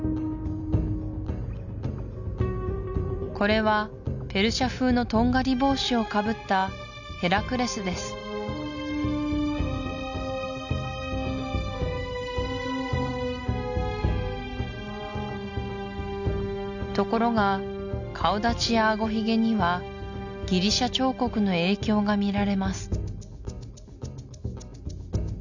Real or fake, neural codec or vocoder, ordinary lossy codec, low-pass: real; none; none; 7.2 kHz